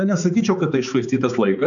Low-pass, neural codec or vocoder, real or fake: 7.2 kHz; codec, 16 kHz, 4 kbps, X-Codec, HuBERT features, trained on balanced general audio; fake